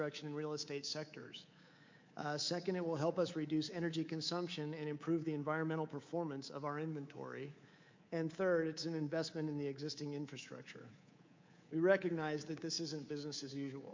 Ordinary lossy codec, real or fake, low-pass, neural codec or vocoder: MP3, 48 kbps; fake; 7.2 kHz; codec, 24 kHz, 3.1 kbps, DualCodec